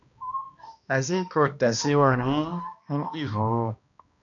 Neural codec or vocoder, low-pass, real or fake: codec, 16 kHz, 1 kbps, X-Codec, HuBERT features, trained on balanced general audio; 7.2 kHz; fake